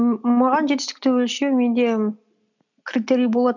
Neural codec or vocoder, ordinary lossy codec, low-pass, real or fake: none; none; 7.2 kHz; real